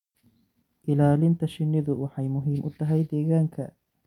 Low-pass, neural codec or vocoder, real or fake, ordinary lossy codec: 19.8 kHz; none; real; none